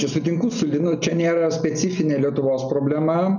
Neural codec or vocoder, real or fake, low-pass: none; real; 7.2 kHz